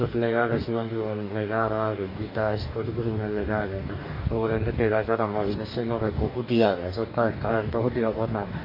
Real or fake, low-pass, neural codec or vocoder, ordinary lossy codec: fake; 5.4 kHz; codec, 44.1 kHz, 2.6 kbps, DAC; MP3, 32 kbps